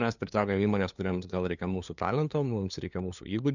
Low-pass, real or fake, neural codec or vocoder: 7.2 kHz; fake; codec, 16 kHz, 2 kbps, FunCodec, trained on LibriTTS, 25 frames a second